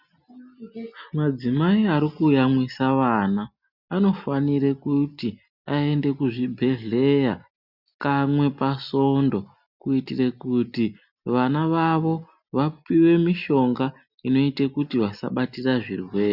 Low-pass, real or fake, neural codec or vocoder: 5.4 kHz; real; none